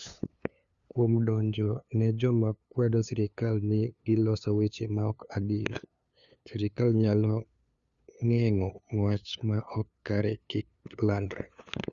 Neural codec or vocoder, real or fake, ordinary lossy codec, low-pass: codec, 16 kHz, 2 kbps, FunCodec, trained on LibriTTS, 25 frames a second; fake; Opus, 64 kbps; 7.2 kHz